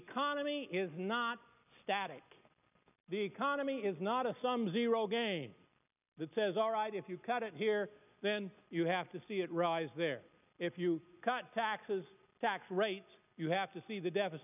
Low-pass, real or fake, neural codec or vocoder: 3.6 kHz; real; none